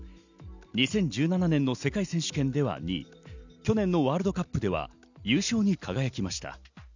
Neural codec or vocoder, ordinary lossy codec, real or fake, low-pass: none; none; real; 7.2 kHz